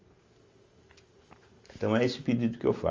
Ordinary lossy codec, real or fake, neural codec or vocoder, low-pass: Opus, 32 kbps; real; none; 7.2 kHz